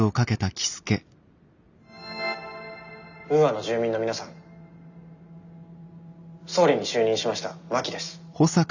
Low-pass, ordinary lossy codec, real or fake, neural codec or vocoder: 7.2 kHz; none; real; none